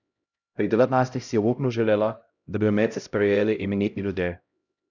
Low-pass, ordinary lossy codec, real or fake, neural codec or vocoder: 7.2 kHz; none; fake; codec, 16 kHz, 0.5 kbps, X-Codec, HuBERT features, trained on LibriSpeech